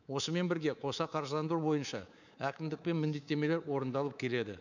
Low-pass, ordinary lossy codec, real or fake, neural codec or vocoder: 7.2 kHz; none; fake; codec, 24 kHz, 3.1 kbps, DualCodec